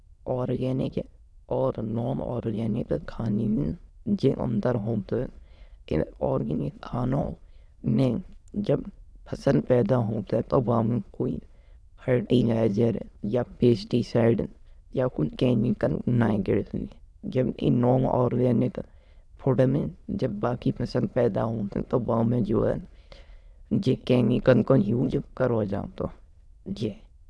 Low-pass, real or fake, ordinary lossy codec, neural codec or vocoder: none; fake; none; autoencoder, 22.05 kHz, a latent of 192 numbers a frame, VITS, trained on many speakers